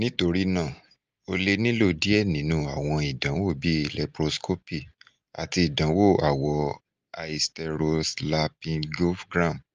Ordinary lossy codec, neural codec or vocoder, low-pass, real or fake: Opus, 24 kbps; none; 7.2 kHz; real